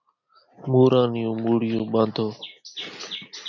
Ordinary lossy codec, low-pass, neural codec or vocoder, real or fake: MP3, 64 kbps; 7.2 kHz; none; real